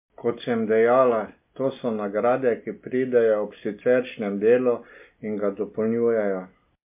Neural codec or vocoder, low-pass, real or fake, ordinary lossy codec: none; 3.6 kHz; real; MP3, 32 kbps